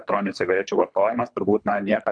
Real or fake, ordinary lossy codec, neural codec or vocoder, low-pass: fake; MP3, 96 kbps; codec, 24 kHz, 3 kbps, HILCodec; 9.9 kHz